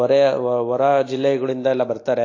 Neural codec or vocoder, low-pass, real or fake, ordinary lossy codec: none; 7.2 kHz; real; AAC, 32 kbps